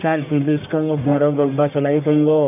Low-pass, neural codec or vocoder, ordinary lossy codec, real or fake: 3.6 kHz; codec, 44.1 kHz, 1.7 kbps, Pupu-Codec; none; fake